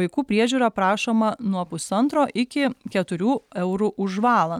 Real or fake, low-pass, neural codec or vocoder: real; 19.8 kHz; none